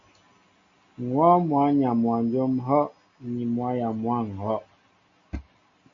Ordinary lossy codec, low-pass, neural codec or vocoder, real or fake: MP3, 48 kbps; 7.2 kHz; none; real